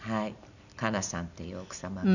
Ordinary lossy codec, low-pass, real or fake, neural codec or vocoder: none; 7.2 kHz; real; none